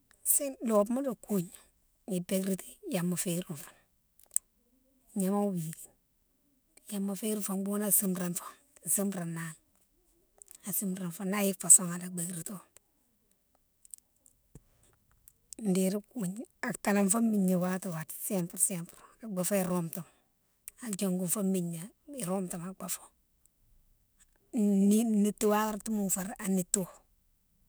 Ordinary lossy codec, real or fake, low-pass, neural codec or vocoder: none; fake; none; autoencoder, 48 kHz, 128 numbers a frame, DAC-VAE, trained on Japanese speech